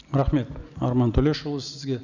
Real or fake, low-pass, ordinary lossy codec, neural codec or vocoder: real; 7.2 kHz; none; none